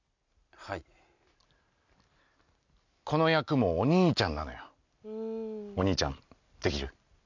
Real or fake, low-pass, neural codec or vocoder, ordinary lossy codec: real; 7.2 kHz; none; none